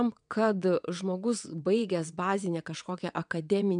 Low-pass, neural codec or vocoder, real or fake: 9.9 kHz; vocoder, 22.05 kHz, 80 mel bands, WaveNeXt; fake